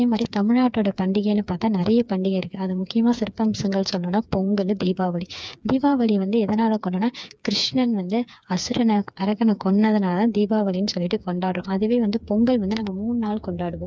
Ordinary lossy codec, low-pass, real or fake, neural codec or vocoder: none; none; fake; codec, 16 kHz, 4 kbps, FreqCodec, smaller model